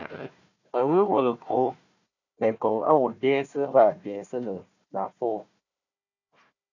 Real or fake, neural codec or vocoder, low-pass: fake; codec, 16 kHz, 1 kbps, FunCodec, trained on Chinese and English, 50 frames a second; 7.2 kHz